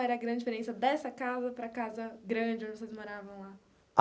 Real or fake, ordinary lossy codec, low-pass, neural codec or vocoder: real; none; none; none